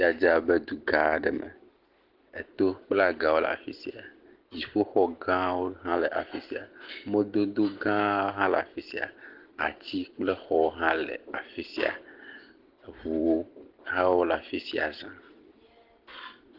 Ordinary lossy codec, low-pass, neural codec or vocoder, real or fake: Opus, 16 kbps; 5.4 kHz; none; real